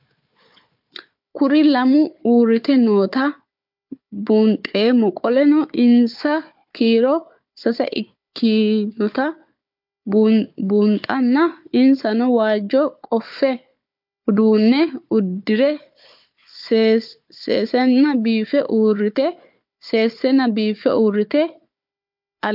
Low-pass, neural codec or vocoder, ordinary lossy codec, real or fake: 5.4 kHz; codec, 16 kHz, 4 kbps, FunCodec, trained on Chinese and English, 50 frames a second; MP3, 48 kbps; fake